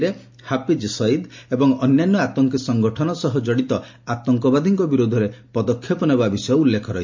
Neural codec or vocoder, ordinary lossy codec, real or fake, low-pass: none; MP3, 48 kbps; real; 7.2 kHz